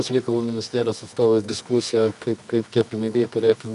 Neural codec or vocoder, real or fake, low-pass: codec, 24 kHz, 0.9 kbps, WavTokenizer, medium music audio release; fake; 10.8 kHz